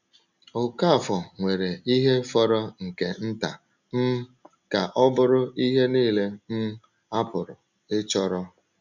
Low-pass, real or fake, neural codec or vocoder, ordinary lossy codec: 7.2 kHz; real; none; none